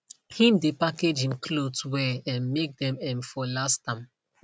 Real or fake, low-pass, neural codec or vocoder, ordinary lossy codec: real; none; none; none